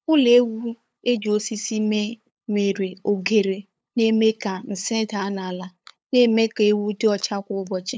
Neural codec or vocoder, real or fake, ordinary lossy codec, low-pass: codec, 16 kHz, 8 kbps, FunCodec, trained on LibriTTS, 25 frames a second; fake; none; none